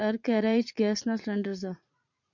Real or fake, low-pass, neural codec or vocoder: fake; 7.2 kHz; vocoder, 44.1 kHz, 80 mel bands, Vocos